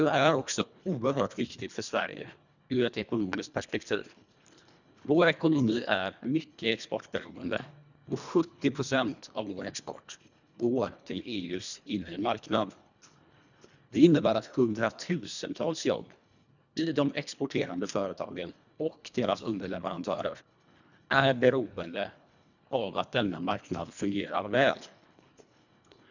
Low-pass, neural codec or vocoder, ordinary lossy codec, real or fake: 7.2 kHz; codec, 24 kHz, 1.5 kbps, HILCodec; none; fake